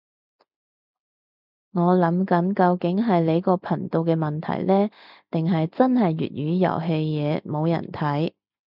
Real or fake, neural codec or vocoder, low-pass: real; none; 5.4 kHz